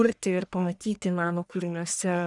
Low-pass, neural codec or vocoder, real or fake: 10.8 kHz; codec, 44.1 kHz, 1.7 kbps, Pupu-Codec; fake